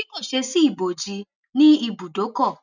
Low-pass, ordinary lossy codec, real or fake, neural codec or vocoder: 7.2 kHz; none; real; none